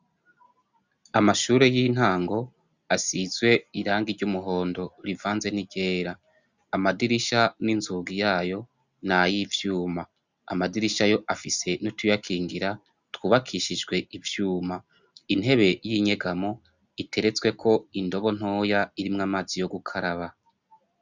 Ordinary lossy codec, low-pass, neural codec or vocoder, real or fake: Opus, 64 kbps; 7.2 kHz; none; real